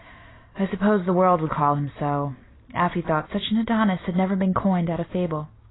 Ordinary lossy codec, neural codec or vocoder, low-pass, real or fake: AAC, 16 kbps; none; 7.2 kHz; real